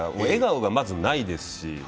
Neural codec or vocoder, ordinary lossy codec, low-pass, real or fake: none; none; none; real